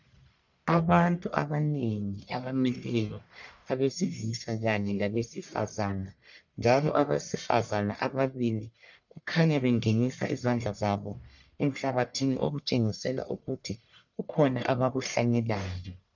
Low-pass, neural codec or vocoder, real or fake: 7.2 kHz; codec, 44.1 kHz, 1.7 kbps, Pupu-Codec; fake